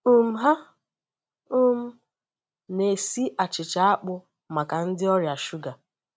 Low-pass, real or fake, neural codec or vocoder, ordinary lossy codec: none; real; none; none